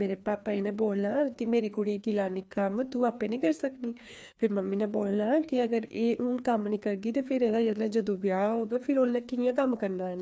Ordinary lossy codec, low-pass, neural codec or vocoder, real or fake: none; none; codec, 16 kHz, 2 kbps, FreqCodec, larger model; fake